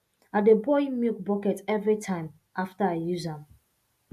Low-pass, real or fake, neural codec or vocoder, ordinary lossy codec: 14.4 kHz; real; none; AAC, 96 kbps